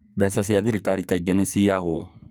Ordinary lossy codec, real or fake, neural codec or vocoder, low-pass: none; fake; codec, 44.1 kHz, 2.6 kbps, SNAC; none